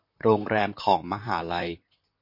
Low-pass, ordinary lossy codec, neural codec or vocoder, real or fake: 5.4 kHz; MP3, 32 kbps; vocoder, 44.1 kHz, 128 mel bands, Pupu-Vocoder; fake